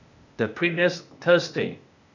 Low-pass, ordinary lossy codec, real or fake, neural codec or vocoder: 7.2 kHz; none; fake; codec, 16 kHz, 0.8 kbps, ZipCodec